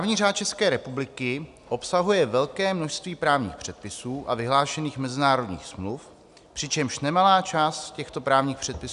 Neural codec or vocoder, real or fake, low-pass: none; real; 10.8 kHz